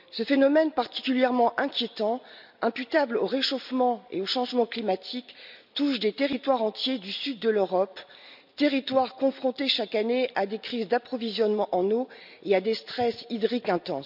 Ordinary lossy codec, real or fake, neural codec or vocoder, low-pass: none; real; none; 5.4 kHz